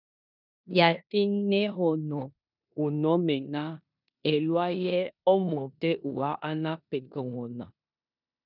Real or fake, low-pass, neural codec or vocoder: fake; 5.4 kHz; codec, 16 kHz in and 24 kHz out, 0.9 kbps, LongCat-Audio-Codec, four codebook decoder